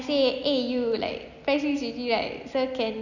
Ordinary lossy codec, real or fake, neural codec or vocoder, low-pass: none; real; none; 7.2 kHz